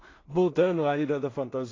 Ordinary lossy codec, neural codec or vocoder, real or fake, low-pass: AAC, 32 kbps; codec, 16 kHz in and 24 kHz out, 0.4 kbps, LongCat-Audio-Codec, two codebook decoder; fake; 7.2 kHz